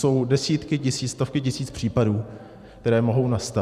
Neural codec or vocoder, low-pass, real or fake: vocoder, 44.1 kHz, 128 mel bands every 512 samples, BigVGAN v2; 14.4 kHz; fake